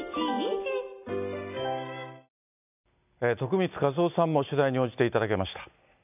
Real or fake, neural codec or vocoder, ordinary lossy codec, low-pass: real; none; none; 3.6 kHz